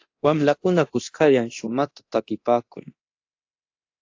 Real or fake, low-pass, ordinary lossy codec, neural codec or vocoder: fake; 7.2 kHz; AAC, 48 kbps; codec, 24 kHz, 0.9 kbps, DualCodec